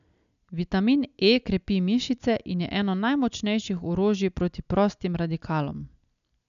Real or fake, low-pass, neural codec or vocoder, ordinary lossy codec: real; 7.2 kHz; none; none